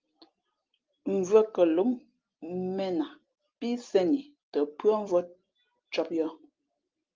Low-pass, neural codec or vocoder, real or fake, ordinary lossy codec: 7.2 kHz; none; real; Opus, 32 kbps